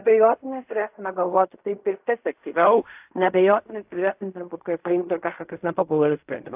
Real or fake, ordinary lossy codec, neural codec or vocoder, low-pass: fake; AAC, 32 kbps; codec, 16 kHz in and 24 kHz out, 0.4 kbps, LongCat-Audio-Codec, fine tuned four codebook decoder; 3.6 kHz